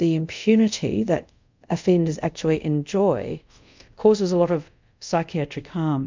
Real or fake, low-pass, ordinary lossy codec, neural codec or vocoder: fake; 7.2 kHz; MP3, 64 kbps; codec, 24 kHz, 0.5 kbps, DualCodec